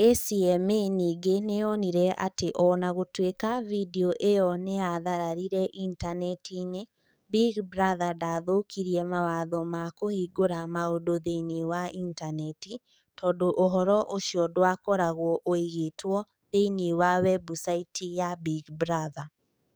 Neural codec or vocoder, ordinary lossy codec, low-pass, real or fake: codec, 44.1 kHz, 7.8 kbps, DAC; none; none; fake